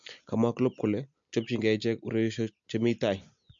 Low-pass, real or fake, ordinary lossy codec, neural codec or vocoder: 7.2 kHz; real; MP3, 48 kbps; none